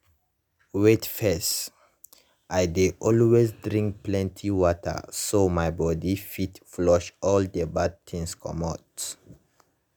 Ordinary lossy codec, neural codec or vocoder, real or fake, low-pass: none; none; real; none